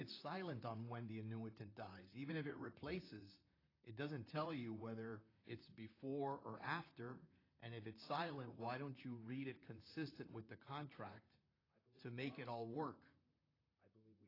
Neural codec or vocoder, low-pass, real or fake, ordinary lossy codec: vocoder, 44.1 kHz, 128 mel bands, Pupu-Vocoder; 5.4 kHz; fake; AAC, 24 kbps